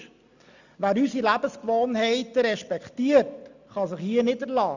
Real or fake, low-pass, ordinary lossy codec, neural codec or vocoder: real; 7.2 kHz; none; none